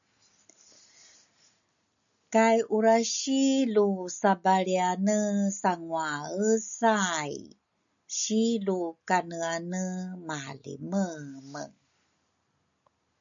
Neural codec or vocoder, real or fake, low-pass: none; real; 7.2 kHz